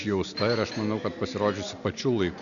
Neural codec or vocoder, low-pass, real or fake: none; 7.2 kHz; real